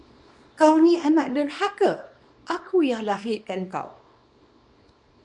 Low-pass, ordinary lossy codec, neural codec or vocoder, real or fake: 10.8 kHz; MP3, 64 kbps; codec, 24 kHz, 0.9 kbps, WavTokenizer, small release; fake